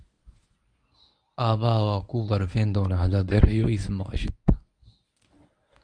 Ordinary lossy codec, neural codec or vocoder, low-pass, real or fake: none; codec, 24 kHz, 0.9 kbps, WavTokenizer, medium speech release version 1; 9.9 kHz; fake